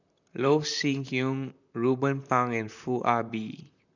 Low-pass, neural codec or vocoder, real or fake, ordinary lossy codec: 7.2 kHz; vocoder, 44.1 kHz, 128 mel bands, Pupu-Vocoder; fake; none